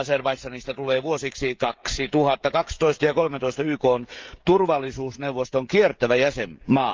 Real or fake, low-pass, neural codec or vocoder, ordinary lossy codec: fake; 7.2 kHz; codec, 16 kHz, 16 kbps, FreqCodec, smaller model; Opus, 32 kbps